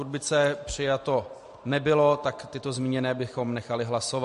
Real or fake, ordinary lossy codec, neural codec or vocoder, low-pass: real; MP3, 48 kbps; none; 14.4 kHz